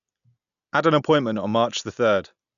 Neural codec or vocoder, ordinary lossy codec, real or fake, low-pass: none; none; real; 7.2 kHz